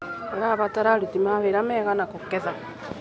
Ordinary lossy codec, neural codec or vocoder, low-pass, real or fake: none; none; none; real